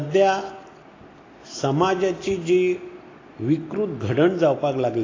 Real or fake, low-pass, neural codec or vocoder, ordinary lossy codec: real; 7.2 kHz; none; AAC, 32 kbps